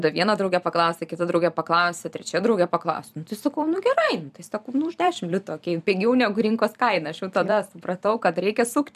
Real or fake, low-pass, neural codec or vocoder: fake; 14.4 kHz; vocoder, 44.1 kHz, 128 mel bands every 256 samples, BigVGAN v2